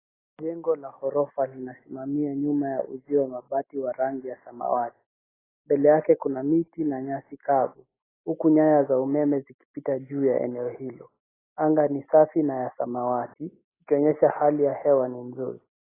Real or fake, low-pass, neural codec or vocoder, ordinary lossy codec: real; 3.6 kHz; none; AAC, 16 kbps